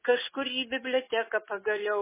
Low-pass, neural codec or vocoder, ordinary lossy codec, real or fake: 3.6 kHz; none; MP3, 16 kbps; real